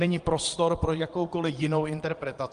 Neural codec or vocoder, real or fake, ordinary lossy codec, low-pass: vocoder, 22.05 kHz, 80 mel bands, Vocos; fake; Opus, 24 kbps; 9.9 kHz